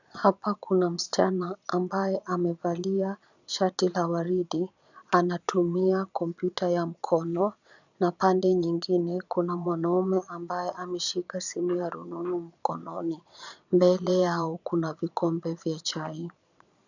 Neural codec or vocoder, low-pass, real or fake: vocoder, 22.05 kHz, 80 mel bands, Vocos; 7.2 kHz; fake